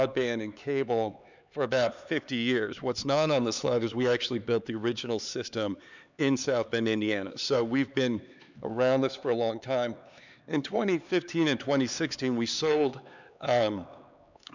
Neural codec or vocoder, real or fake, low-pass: codec, 16 kHz, 4 kbps, X-Codec, HuBERT features, trained on LibriSpeech; fake; 7.2 kHz